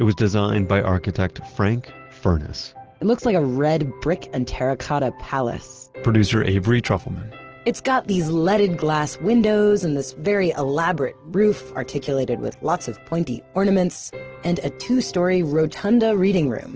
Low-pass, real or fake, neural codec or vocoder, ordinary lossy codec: 7.2 kHz; real; none; Opus, 16 kbps